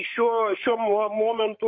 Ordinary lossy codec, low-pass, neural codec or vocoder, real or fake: MP3, 32 kbps; 7.2 kHz; codec, 16 kHz, 8 kbps, FreqCodec, larger model; fake